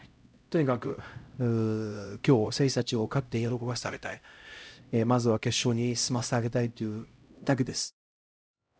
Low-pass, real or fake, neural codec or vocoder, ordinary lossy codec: none; fake; codec, 16 kHz, 0.5 kbps, X-Codec, HuBERT features, trained on LibriSpeech; none